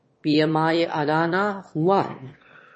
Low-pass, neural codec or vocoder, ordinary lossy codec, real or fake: 9.9 kHz; autoencoder, 22.05 kHz, a latent of 192 numbers a frame, VITS, trained on one speaker; MP3, 32 kbps; fake